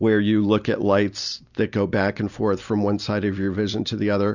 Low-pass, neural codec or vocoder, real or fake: 7.2 kHz; none; real